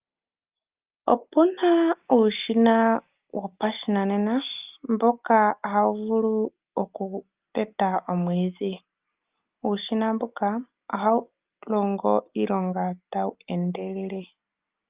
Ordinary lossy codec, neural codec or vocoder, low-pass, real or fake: Opus, 32 kbps; none; 3.6 kHz; real